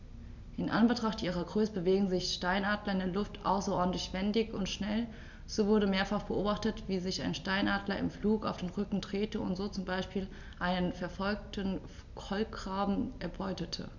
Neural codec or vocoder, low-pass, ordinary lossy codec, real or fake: none; 7.2 kHz; none; real